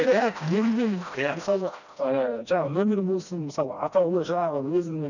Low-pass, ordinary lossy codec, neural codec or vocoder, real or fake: 7.2 kHz; none; codec, 16 kHz, 1 kbps, FreqCodec, smaller model; fake